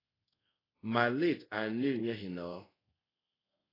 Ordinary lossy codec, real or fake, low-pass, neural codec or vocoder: AAC, 24 kbps; fake; 5.4 kHz; codec, 24 kHz, 0.5 kbps, DualCodec